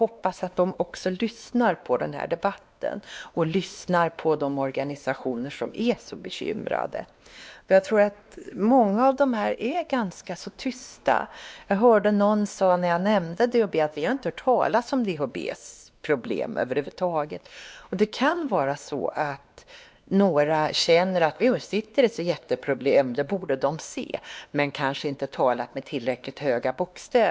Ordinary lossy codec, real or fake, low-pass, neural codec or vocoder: none; fake; none; codec, 16 kHz, 2 kbps, X-Codec, WavLM features, trained on Multilingual LibriSpeech